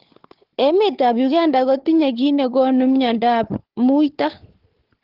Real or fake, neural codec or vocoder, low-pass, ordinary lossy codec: fake; codec, 16 kHz, 4 kbps, FunCodec, trained on Chinese and English, 50 frames a second; 5.4 kHz; Opus, 16 kbps